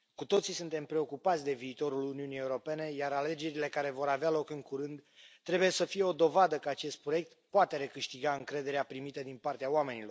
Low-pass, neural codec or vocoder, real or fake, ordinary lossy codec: none; none; real; none